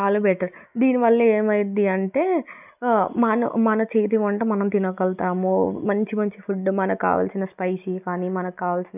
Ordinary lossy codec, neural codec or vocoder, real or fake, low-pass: none; none; real; 3.6 kHz